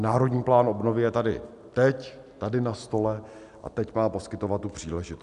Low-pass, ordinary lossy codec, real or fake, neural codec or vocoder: 10.8 kHz; MP3, 96 kbps; real; none